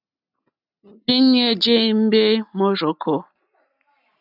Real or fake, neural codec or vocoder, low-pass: real; none; 5.4 kHz